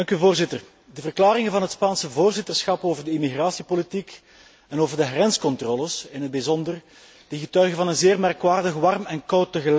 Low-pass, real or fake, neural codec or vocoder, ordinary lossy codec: none; real; none; none